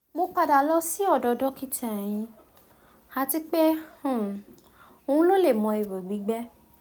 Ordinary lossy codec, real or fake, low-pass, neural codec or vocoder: none; real; none; none